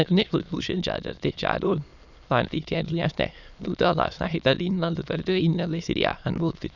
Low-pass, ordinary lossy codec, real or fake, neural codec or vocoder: 7.2 kHz; none; fake; autoencoder, 22.05 kHz, a latent of 192 numbers a frame, VITS, trained on many speakers